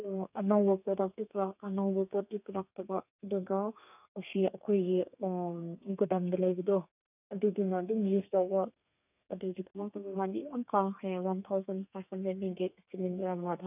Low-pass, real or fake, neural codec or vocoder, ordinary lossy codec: 3.6 kHz; fake; codec, 32 kHz, 1.9 kbps, SNAC; none